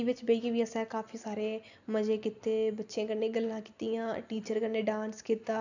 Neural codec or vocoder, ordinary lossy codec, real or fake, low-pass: none; none; real; 7.2 kHz